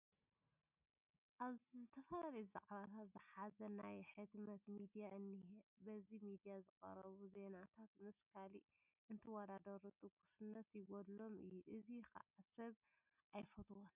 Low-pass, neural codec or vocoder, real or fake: 3.6 kHz; none; real